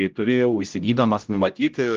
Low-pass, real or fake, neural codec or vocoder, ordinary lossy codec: 7.2 kHz; fake; codec, 16 kHz, 0.5 kbps, X-Codec, HuBERT features, trained on general audio; Opus, 24 kbps